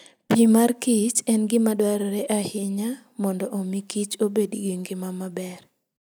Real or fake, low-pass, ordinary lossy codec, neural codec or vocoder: real; none; none; none